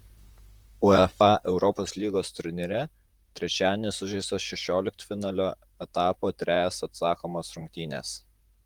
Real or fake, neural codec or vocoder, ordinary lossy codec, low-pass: fake; vocoder, 44.1 kHz, 128 mel bands, Pupu-Vocoder; Opus, 24 kbps; 19.8 kHz